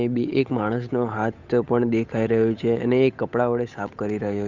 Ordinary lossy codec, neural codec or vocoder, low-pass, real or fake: Opus, 64 kbps; codec, 16 kHz, 8 kbps, FunCodec, trained on Chinese and English, 25 frames a second; 7.2 kHz; fake